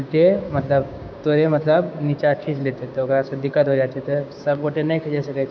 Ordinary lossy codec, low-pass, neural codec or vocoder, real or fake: none; none; codec, 16 kHz, 6 kbps, DAC; fake